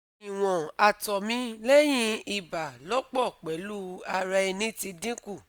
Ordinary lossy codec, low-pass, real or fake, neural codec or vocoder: none; none; real; none